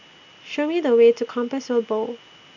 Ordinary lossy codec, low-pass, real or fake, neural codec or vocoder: none; 7.2 kHz; real; none